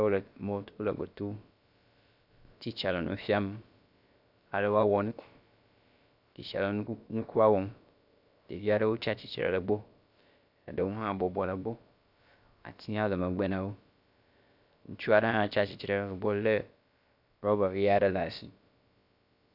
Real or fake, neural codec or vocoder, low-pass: fake; codec, 16 kHz, about 1 kbps, DyCAST, with the encoder's durations; 5.4 kHz